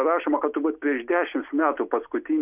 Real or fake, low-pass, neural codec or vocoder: real; 3.6 kHz; none